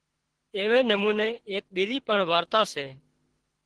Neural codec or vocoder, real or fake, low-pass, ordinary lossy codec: codec, 24 kHz, 3 kbps, HILCodec; fake; 10.8 kHz; Opus, 16 kbps